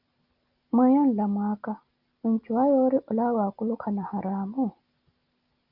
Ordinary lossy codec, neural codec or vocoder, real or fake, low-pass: Opus, 24 kbps; none; real; 5.4 kHz